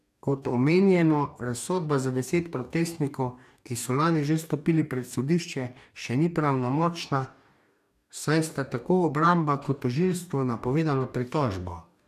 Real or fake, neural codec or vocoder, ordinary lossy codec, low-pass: fake; codec, 44.1 kHz, 2.6 kbps, DAC; none; 14.4 kHz